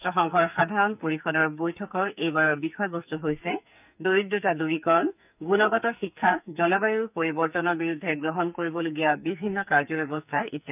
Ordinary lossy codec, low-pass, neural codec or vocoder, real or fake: none; 3.6 kHz; codec, 44.1 kHz, 2.6 kbps, SNAC; fake